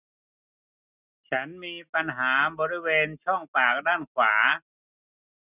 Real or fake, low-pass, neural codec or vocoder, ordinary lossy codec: real; 3.6 kHz; none; none